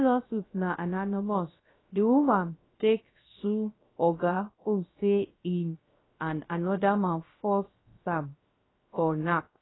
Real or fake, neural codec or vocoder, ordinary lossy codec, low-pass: fake; codec, 16 kHz, 0.3 kbps, FocalCodec; AAC, 16 kbps; 7.2 kHz